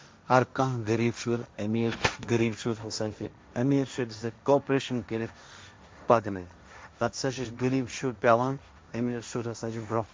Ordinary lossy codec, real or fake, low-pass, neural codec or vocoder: none; fake; none; codec, 16 kHz, 1.1 kbps, Voila-Tokenizer